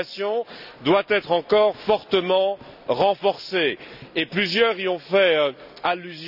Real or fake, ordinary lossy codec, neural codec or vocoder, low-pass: real; none; none; 5.4 kHz